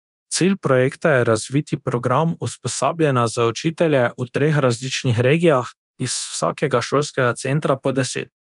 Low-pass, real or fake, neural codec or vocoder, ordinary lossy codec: 10.8 kHz; fake; codec, 24 kHz, 0.9 kbps, DualCodec; none